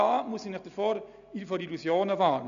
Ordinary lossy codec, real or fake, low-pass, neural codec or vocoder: none; real; 7.2 kHz; none